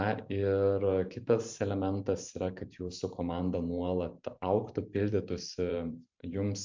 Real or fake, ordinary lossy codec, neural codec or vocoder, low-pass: fake; MP3, 64 kbps; vocoder, 44.1 kHz, 128 mel bands every 512 samples, BigVGAN v2; 7.2 kHz